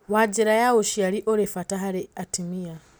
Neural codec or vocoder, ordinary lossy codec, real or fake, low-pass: none; none; real; none